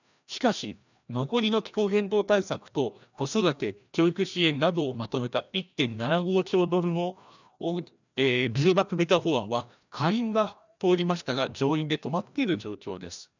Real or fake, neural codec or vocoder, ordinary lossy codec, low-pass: fake; codec, 16 kHz, 1 kbps, FreqCodec, larger model; none; 7.2 kHz